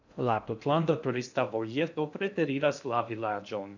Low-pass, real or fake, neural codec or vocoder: 7.2 kHz; fake; codec, 16 kHz in and 24 kHz out, 0.6 kbps, FocalCodec, streaming, 2048 codes